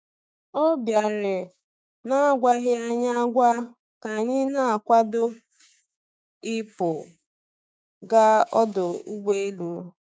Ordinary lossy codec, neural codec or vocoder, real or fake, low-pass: none; codec, 16 kHz, 6 kbps, DAC; fake; none